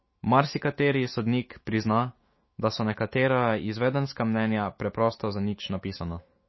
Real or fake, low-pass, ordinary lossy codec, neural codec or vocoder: fake; 7.2 kHz; MP3, 24 kbps; autoencoder, 48 kHz, 128 numbers a frame, DAC-VAE, trained on Japanese speech